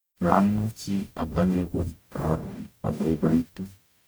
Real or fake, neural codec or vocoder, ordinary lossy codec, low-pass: fake; codec, 44.1 kHz, 0.9 kbps, DAC; none; none